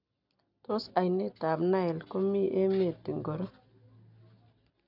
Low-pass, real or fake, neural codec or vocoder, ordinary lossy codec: 5.4 kHz; real; none; none